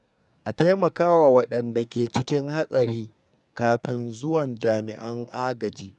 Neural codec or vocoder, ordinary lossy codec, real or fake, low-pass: codec, 24 kHz, 1 kbps, SNAC; none; fake; 10.8 kHz